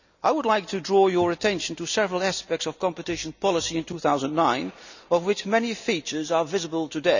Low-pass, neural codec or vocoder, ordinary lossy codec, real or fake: 7.2 kHz; none; none; real